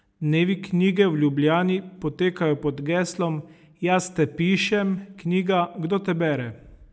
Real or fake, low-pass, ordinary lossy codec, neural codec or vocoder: real; none; none; none